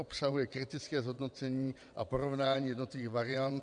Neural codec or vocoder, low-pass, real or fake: vocoder, 22.05 kHz, 80 mel bands, WaveNeXt; 9.9 kHz; fake